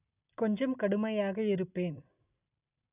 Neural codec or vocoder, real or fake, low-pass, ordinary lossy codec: none; real; 3.6 kHz; none